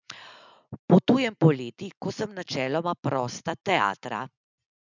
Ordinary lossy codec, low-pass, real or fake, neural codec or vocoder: none; 7.2 kHz; real; none